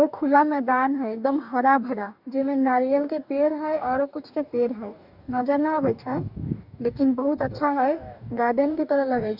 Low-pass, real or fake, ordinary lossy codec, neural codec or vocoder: 5.4 kHz; fake; none; codec, 44.1 kHz, 2.6 kbps, DAC